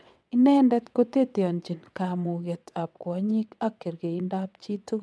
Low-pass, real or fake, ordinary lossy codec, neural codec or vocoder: none; fake; none; vocoder, 22.05 kHz, 80 mel bands, WaveNeXt